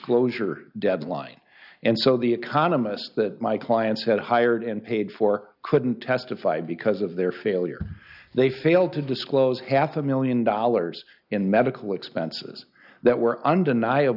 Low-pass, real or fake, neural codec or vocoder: 5.4 kHz; real; none